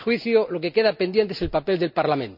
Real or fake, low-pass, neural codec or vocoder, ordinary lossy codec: real; 5.4 kHz; none; none